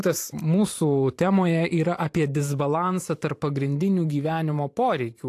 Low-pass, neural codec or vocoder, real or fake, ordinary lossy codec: 14.4 kHz; vocoder, 44.1 kHz, 128 mel bands every 512 samples, BigVGAN v2; fake; AAC, 64 kbps